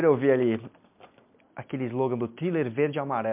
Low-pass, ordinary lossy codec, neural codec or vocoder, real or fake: 3.6 kHz; MP3, 32 kbps; none; real